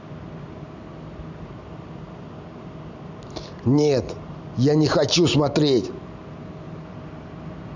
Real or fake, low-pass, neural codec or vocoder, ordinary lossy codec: real; 7.2 kHz; none; none